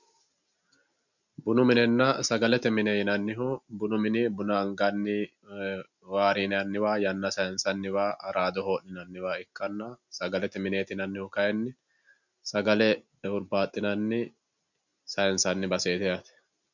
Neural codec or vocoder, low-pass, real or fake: none; 7.2 kHz; real